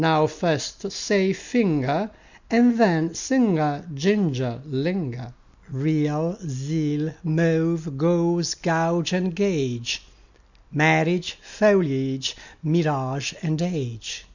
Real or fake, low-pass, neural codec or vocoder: real; 7.2 kHz; none